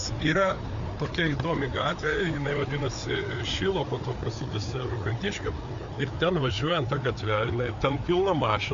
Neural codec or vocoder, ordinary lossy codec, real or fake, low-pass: codec, 16 kHz, 4 kbps, FreqCodec, larger model; MP3, 64 kbps; fake; 7.2 kHz